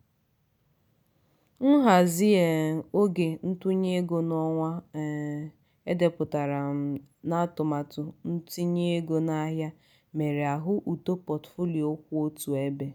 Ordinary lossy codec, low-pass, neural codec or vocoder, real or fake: none; none; none; real